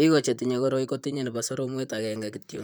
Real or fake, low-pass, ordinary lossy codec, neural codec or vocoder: fake; none; none; vocoder, 44.1 kHz, 128 mel bands, Pupu-Vocoder